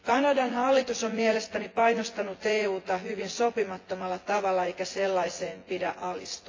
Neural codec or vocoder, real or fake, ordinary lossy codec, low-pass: vocoder, 24 kHz, 100 mel bands, Vocos; fake; AAC, 32 kbps; 7.2 kHz